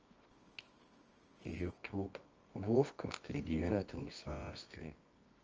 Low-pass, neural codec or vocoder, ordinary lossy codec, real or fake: 7.2 kHz; codec, 24 kHz, 0.9 kbps, WavTokenizer, medium music audio release; Opus, 24 kbps; fake